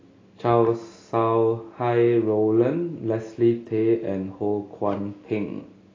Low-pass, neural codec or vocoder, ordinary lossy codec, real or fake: 7.2 kHz; none; AAC, 32 kbps; real